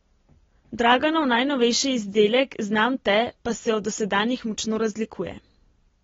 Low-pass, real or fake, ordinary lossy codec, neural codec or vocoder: 7.2 kHz; real; AAC, 24 kbps; none